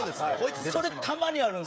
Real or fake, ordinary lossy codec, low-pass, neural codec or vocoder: fake; none; none; codec, 16 kHz, 16 kbps, FreqCodec, smaller model